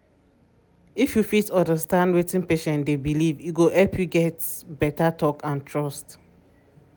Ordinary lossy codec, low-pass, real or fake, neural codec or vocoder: none; none; real; none